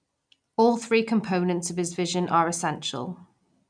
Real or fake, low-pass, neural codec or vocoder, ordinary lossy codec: real; 9.9 kHz; none; none